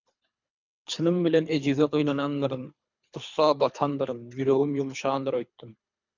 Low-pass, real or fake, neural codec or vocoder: 7.2 kHz; fake; codec, 24 kHz, 3 kbps, HILCodec